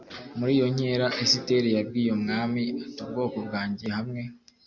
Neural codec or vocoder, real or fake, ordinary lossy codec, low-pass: none; real; Opus, 64 kbps; 7.2 kHz